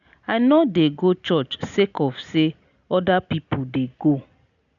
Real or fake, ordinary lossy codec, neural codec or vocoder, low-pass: real; none; none; 7.2 kHz